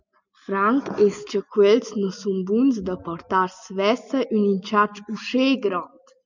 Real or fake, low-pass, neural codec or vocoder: real; 7.2 kHz; none